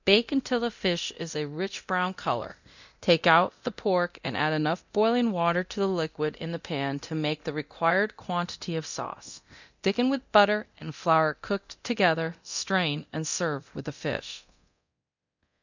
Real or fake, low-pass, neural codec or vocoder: fake; 7.2 kHz; codec, 24 kHz, 0.9 kbps, DualCodec